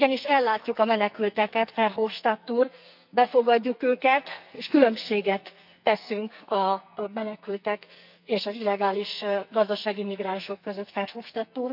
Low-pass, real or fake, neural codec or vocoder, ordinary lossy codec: 5.4 kHz; fake; codec, 44.1 kHz, 2.6 kbps, SNAC; none